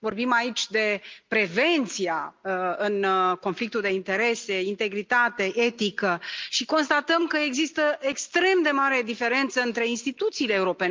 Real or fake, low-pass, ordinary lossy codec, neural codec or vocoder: real; 7.2 kHz; Opus, 24 kbps; none